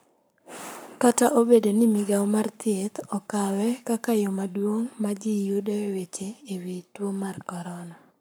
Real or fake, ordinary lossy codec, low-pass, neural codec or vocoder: fake; none; none; codec, 44.1 kHz, 7.8 kbps, Pupu-Codec